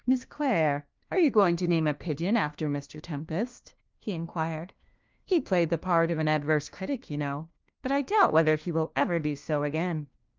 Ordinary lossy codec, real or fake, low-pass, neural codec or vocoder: Opus, 32 kbps; fake; 7.2 kHz; codec, 16 kHz, 1 kbps, FunCodec, trained on LibriTTS, 50 frames a second